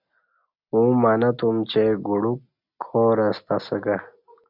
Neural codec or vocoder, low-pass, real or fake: none; 5.4 kHz; real